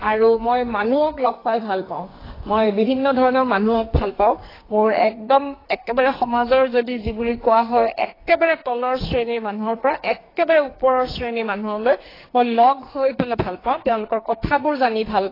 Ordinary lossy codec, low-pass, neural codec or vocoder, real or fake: AAC, 24 kbps; 5.4 kHz; codec, 44.1 kHz, 2.6 kbps, SNAC; fake